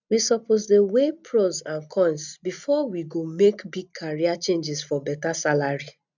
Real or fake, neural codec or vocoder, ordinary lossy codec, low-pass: real; none; none; 7.2 kHz